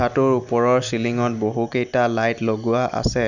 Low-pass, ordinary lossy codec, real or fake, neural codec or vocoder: 7.2 kHz; none; real; none